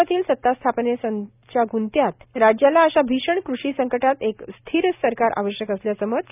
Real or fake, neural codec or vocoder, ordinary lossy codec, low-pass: real; none; none; 3.6 kHz